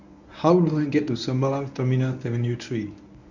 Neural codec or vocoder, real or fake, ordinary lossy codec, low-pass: codec, 24 kHz, 0.9 kbps, WavTokenizer, medium speech release version 1; fake; none; 7.2 kHz